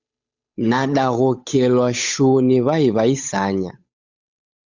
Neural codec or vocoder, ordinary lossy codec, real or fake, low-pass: codec, 16 kHz, 8 kbps, FunCodec, trained on Chinese and English, 25 frames a second; Opus, 64 kbps; fake; 7.2 kHz